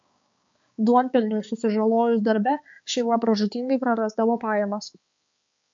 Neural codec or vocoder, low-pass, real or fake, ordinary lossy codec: codec, 16 kHz, 4 kbps, X-Codec, HuBERT features, trained on balanced general audio; 7.2 kHz; fake; MP3, 48 kbps